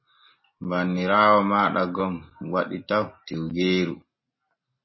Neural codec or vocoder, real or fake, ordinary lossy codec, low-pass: none; real; MP3, 24 kbps; 7.2 kHz